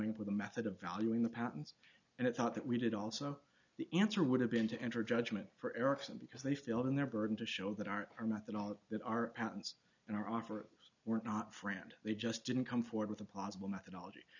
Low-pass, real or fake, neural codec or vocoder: 7.2 kHz; real; none